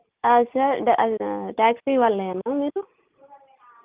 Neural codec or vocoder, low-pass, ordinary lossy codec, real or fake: none; 3.6 kHz; Opus, 24 kbps; real